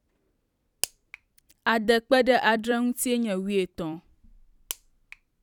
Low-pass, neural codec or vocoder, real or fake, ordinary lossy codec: 19.8 kHz; vocoder, 44.1 kHz, 128 mel bands every 256 samples, BigVGAN v2; fake; none